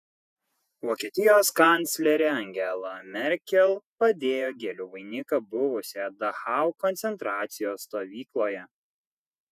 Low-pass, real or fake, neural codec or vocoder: 14.4 kHz; fake; vocoder, 48 kHz, 128 mel bands, Vocos